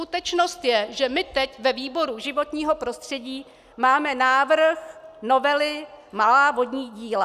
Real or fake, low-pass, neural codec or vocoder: real; 14.4 kHz; none